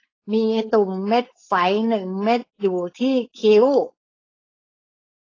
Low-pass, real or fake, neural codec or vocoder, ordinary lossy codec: 7.2 kHz; fake; codec, 16 kHz, 4.8 kbps, FACodec; AAC, 32 kbps